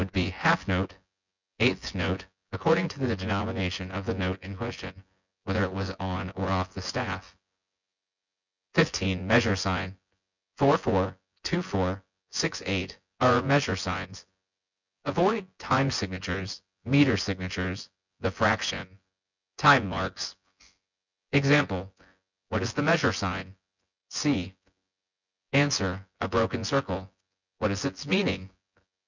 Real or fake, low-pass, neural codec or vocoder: fake; 7.2 kHz; vocoder, 24 kHz, 100 mel bands, Vocos